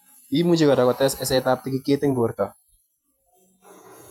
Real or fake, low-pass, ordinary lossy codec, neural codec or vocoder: fake; 19.8 kHz; none; vocoder, 48 kHz, 128 mel bands, Vocos